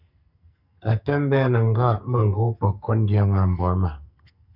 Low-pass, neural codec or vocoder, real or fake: 5.4 kHz; codec, 44.1 kHz, 2.6 kbps, SNAC; fake